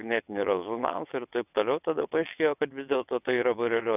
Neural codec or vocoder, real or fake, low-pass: vocoder, 22.05 kHz, 80 mel bands, WaveNeXt; fake; 3.6 kHz